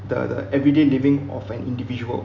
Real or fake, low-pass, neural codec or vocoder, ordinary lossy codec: real; 7.2 kHz; none; none